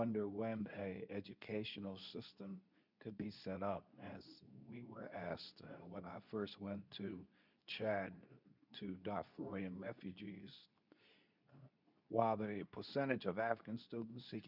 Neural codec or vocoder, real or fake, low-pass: codec, 24 kHz, 0.9 kbps, WavTokenizer, medium speech release version 2; fake; 5.4 kHz